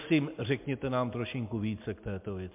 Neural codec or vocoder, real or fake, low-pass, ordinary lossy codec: none; real; 3.6 kHz; MP3, 32 kbps